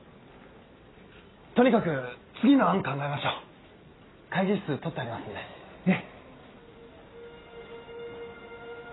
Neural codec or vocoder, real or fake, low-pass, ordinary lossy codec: none; real; 7.2 kHz; AAC, 16 kbps